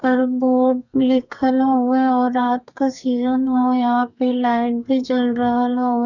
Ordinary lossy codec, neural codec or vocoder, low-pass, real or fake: none; codec, 32 kHz, 1.9 kbps, SNAC; 7.2 kHz; fake